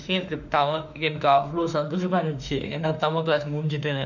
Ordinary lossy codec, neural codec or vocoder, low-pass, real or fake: none; autoencoder, 48 kHz, 32 numbers a frame, DAC-VAE, trained on Japanese speech; 7.2 kHz; fake